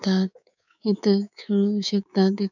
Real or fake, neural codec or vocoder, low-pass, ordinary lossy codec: fake; codec, 24 kHz, 3.1 kbps, DualCodec; 7.2 kHz; none